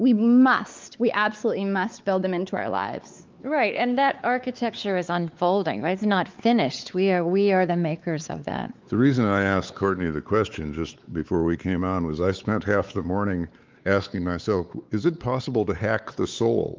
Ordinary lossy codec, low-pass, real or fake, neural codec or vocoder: Opus, 32 kbps; 7.2 kHz; fake; codec, 16 kHz, 4 kbps, X-Codec, WavLM features, trained on Multilingual LibriSpeech